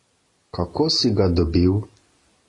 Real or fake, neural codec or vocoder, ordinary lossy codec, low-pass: real; none; AAC, 32 kbps; 10.8 kHz